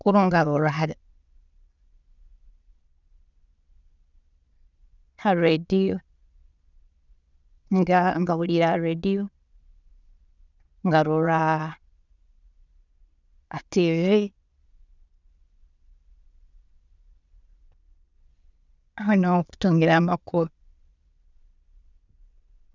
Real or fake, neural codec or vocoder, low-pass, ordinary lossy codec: fake; vocoder, 22.05 kHz, 80 mel bands, Vocos; 7.2 kHz; none